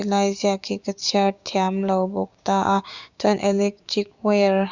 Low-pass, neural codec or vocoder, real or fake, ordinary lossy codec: 7.2 kHz; codec, 16 kHz, 6 kbps, DAC; fake; Opus, 64 kbps